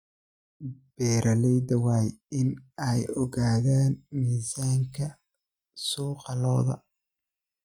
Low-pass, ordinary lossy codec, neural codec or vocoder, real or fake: 19.8 kHz; none; none; real